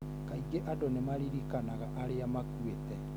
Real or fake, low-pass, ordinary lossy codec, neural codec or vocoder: real; none; none; none